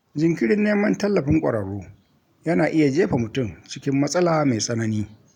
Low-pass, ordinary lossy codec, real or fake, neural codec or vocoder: 19.8 kHz; none; real; none